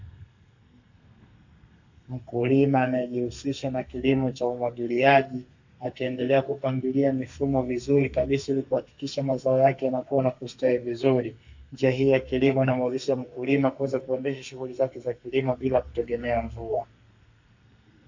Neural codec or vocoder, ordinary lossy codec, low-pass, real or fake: codec, 32 kHz, 1.9 kbps, SNAC; AAC, 48 kbps; 7.2 kHz; fake